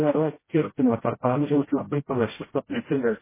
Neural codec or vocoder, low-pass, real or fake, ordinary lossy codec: codec, 16 kHz, 0.5 kbps, FreqCodec, smaller model; 3.6 kHz; fake; MP3, 16 kbps